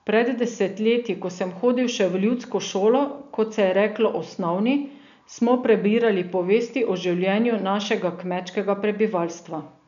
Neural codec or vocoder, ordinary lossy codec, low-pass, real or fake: none; none; 7.2 kHz; real